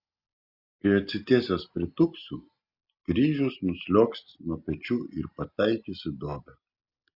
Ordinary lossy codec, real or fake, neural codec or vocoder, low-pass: Opus, 64 kbps; real; none; 5.4 kHz